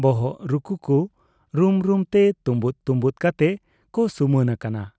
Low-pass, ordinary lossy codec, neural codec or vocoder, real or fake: none; none; none; real